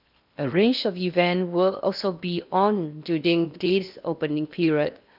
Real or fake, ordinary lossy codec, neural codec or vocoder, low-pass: fake; none; codec, 16 kHz in and 24 kHz out, 0.8 kbps, FocalCodec, streaming, 65536 codes; 5.4 kHz